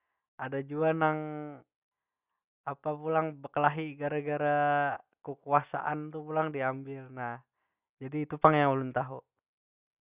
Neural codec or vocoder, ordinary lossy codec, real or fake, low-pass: none; Opus, 64 kbps; real; 3.6 kHz